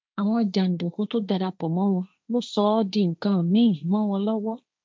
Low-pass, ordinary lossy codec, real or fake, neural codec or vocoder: none; none; fake; codec, 16 kHz, 1.1 kbps, Voila-Tokenizer